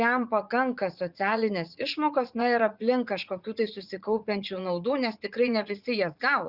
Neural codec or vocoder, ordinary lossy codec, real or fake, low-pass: vocoder, 44.1 kHz, 80 mel bands, Vocos; Opus, 64 kbps; fake; 5.4 kHz